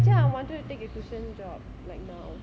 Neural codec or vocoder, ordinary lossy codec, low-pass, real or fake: none; none; none; real